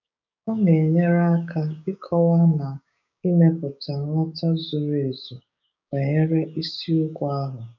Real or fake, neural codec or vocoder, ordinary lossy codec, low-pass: fake; codec, 16 kHz, 6 kbps, DAC; none; 7.2 kHz